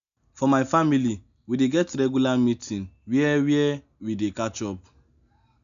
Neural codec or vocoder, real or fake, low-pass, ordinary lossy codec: none; real; 7.2 kHz; none